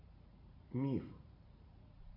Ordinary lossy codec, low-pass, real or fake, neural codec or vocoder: AAC, 24 kbps; 5.4 kHz; fake; vocoder, 44.1 kHz, 80 mel bands, Vocos